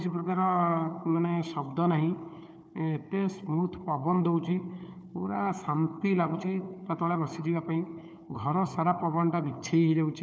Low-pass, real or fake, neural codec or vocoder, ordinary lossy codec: none; fake; codec, 16 kHz, 4 kbps, FunCodec, trained on Chinese and English, 50 frames a second; none